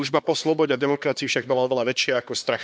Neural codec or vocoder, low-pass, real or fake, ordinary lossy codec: codec, 16 kHz, 2 kbps, X-Codec, HuBERT features, trained on LibriSpeech; none; fake; none